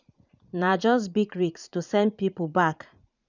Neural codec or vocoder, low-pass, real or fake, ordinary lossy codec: vocoder, 44.1 kHz, 80 mel bands, Vocos; 7.2 kHz; fake; none